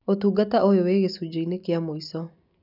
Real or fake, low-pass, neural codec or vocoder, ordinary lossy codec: real; 5.4 kHz; none; none